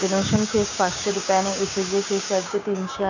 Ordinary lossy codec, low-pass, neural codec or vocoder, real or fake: none; 7.2 kHz; codec, 16 kHz, 6 kbps, DAC; fake